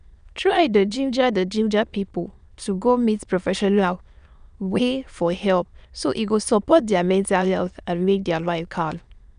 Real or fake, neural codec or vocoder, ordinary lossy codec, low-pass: fake; autoencoder, 22.05 kHz, a latent of 192 numbers a frame, VITS, trained on many speakers; none; 9.9 kHz